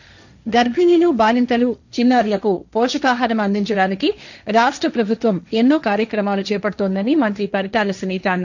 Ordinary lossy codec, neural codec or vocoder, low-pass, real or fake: none; codec, 16 kHz, 1.1 kbps, Voila-Tokenizer; 7.2 kHz; fake